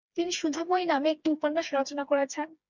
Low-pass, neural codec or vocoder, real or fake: 7.2 kHz; codec, 16 kHz, 2 kbps, FreqCodec, smaller model; fake